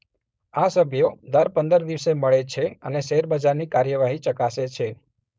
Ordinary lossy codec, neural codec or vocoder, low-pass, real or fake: none; codec, 16 kHz, 4.8 kbps, FACodec; none; fake